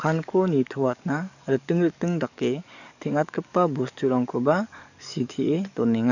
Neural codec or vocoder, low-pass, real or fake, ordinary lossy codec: codec, 44.1 kHz, 7.8 kbps, DAC; 7.2 kHz; fake; none